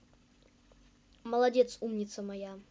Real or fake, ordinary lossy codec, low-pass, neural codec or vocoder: real; none; none; none